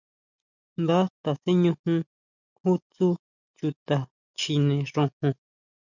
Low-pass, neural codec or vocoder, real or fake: 7.2 kHz; none; real